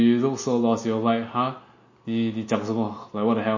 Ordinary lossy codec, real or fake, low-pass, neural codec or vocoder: MP3, 32 kbps; real; 7.2 kHz; none